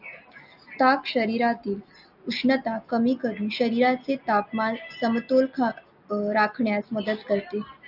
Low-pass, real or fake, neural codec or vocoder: 5.4 kHz; real; none